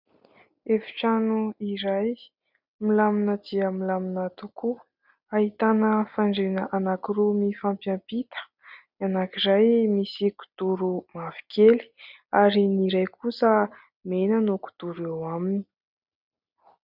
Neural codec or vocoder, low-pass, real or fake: none; 5.4 kHz; real